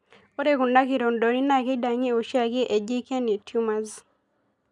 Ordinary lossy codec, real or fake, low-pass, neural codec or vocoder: none; real; 10.8 kHz; none